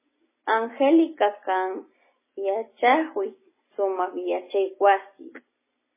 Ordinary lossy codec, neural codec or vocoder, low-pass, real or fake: MP3, 16 kbps; none; 3.6 kHz; real